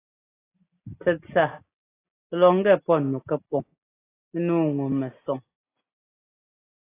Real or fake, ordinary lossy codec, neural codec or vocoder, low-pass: real; AAC, 16 kbps; none; 3.6 kHz